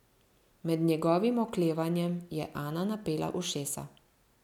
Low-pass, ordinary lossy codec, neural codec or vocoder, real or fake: 19.8 kHz; none; none; real